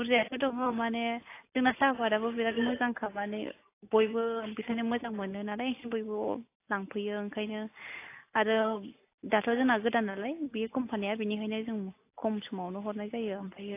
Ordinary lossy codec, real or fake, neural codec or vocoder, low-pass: AAC, 24 kbps; real; none; 3.6 kHz